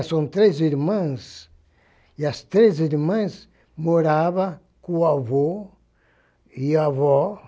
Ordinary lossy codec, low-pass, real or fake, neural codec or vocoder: none; none; real; none